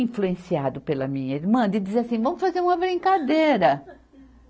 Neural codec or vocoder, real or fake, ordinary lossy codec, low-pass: none; real; none; none